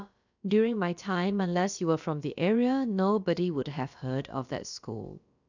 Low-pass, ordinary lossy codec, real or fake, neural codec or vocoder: 7.2 kHz; none; fake; codec, 16 kHz, about 1 kbps, DyCAST, with the encoder's durations